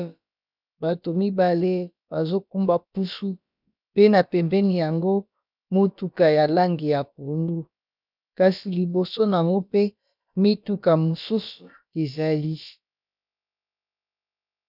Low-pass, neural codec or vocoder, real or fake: 5.4 kHz; codec, 16 kHz, about 1 kbps, DyCAST, with the encoder's durations; fake